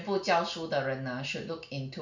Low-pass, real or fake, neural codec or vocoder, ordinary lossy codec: 7.2 kHz; real; none; none